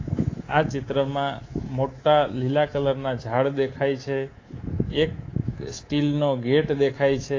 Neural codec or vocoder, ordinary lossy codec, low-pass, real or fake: codec, 24 kHz, 3.1 kbps, DualCodec; AAC, 32 kbps; 7.2 kHz; fake